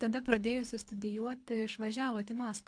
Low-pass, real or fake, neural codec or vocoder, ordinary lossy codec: 9.9 kHz; fake; codec, 24 kHz, 3 kbps, HILCodec; AAC, 64 kbps